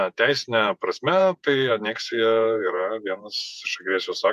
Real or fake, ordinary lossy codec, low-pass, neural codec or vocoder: fake; MP3, 96 kbps; 14.4 kHz; vocoder, 48 kHz, 128 mel bands, Vocos